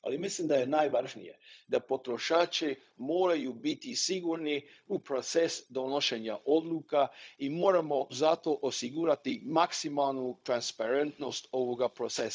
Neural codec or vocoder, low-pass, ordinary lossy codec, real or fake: codec, 16 kHz, 0.4 kbps, LongCat-Audio-Codec; none; none; fake